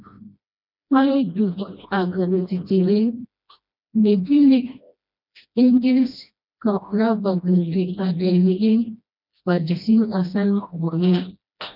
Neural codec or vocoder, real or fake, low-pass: codec, 16 kHz, 1 kbps, FreqCodec, smaller model; fake; 5.4 kHz